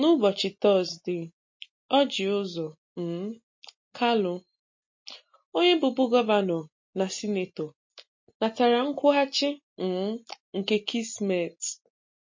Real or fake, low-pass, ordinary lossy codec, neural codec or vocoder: real; 7.2 kHz; MP3, 32 kbps; none